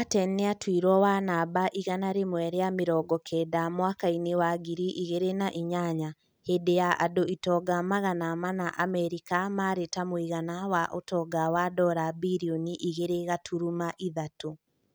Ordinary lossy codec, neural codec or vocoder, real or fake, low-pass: none; none; real; none